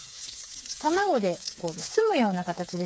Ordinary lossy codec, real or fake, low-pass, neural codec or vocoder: none; fake; none; codec, 16 kHz, 4 kbps, FreqCodec, smaller model